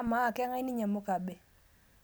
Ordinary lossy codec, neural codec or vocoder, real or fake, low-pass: none; none; real; none